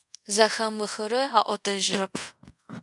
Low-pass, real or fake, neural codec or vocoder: 10.8 kHz; fake; codec, 24 kHz, 0.5 kbps, DualCodec